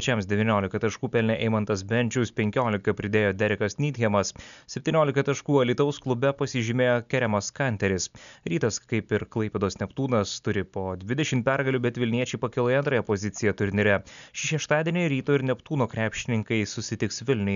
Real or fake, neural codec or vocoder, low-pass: real; none; 7.2 kHz